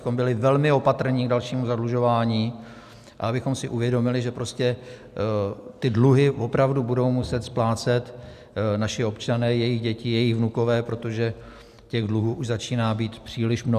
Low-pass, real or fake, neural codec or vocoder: 14.4 kHz; real; none